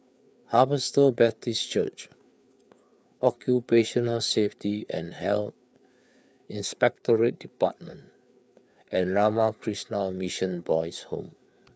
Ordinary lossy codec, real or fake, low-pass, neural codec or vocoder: none; fake; none; codec, 16 kHz, 4 kbps, FreqCodec, larger model